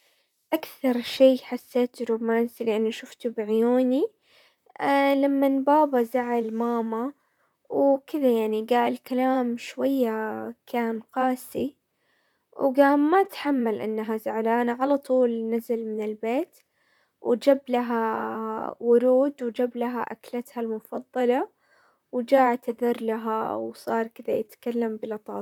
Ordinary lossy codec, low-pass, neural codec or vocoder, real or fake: none; 19.8 kHz; vocoder, 44.1 kHz, 128 mel bands, Pupu-Vocoder; fake